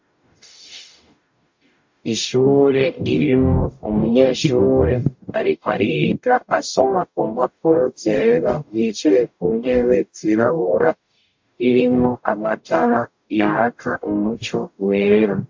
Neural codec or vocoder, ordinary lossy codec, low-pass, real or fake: codec, 44.1 kHz, 0.9 kbps, DAC; MP3, 48 kbps; 7.2 kHz; fake